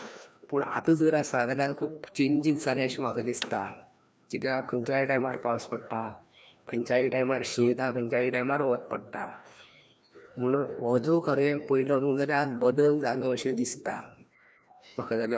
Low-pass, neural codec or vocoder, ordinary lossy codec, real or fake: none; codec, 16 kHz, 1 kbps, FreqCodec, larger model; none; fake